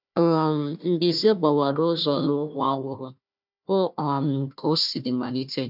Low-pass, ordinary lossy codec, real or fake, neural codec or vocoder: 5.4 kHz; none; fake; codec, 16 kHz, 1 kbps, FunCodec, trained on Chinese and English, 50 frames a second